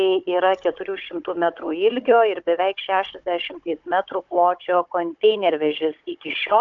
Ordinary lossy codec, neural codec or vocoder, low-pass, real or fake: AAC, 64 kbps; codec, 16 kHz, 16 kbps, FunCodec, trained on Chinese and English, 50 frames a second; 7.2 kHz; fake